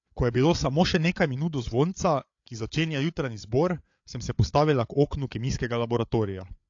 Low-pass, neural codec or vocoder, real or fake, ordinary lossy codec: 7.2 kHz; codec, 16 kHz, 8 kbps, FreqCodec, larger model; fake; AAC, 48 kbps